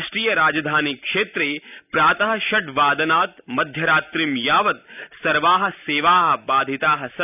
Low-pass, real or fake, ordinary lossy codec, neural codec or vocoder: 3.6 kHz; real; none; none